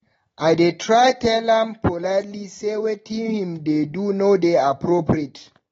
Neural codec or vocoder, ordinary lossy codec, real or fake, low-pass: none; AAC, 24 kbps; real; 19.8 kHz